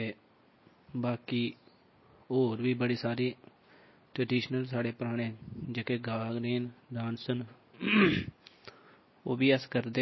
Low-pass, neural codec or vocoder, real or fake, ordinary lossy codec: 7.2 kHz; none; real; MP3, 24 kbps